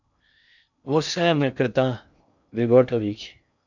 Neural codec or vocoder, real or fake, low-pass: codec, 16 kHz in and 24 kHz out, 0.6 kbps, FocalCodec, streaming, 2048 codes; fake; 7.2 kHz